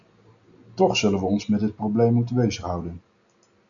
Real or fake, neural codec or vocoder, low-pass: real; none; 7.2 kHz